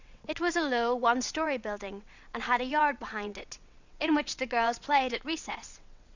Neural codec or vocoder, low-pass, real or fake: vocoder, 22.05 kHz, 80 mel bands, WaveNeXt; 7.2 kHz; fake